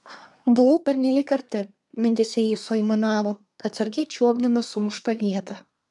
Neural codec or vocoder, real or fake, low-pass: codec, 24 kHz, 1 kbps, SNAC; fake; 10.8 kHz